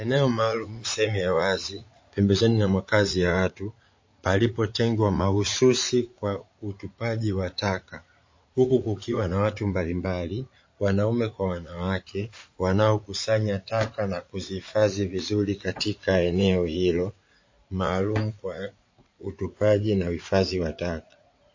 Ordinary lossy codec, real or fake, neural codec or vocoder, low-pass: MP3, 32 kbps; fake; vocoder, 44.1 kHz, 80 mel bands, Vocos; 7.2 kHz